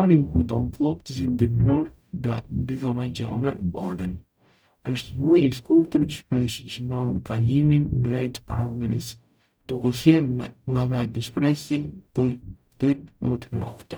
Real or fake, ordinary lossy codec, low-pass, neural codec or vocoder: fake; none; none; codec, 44.1 kHz, 0.9 kbps, DAC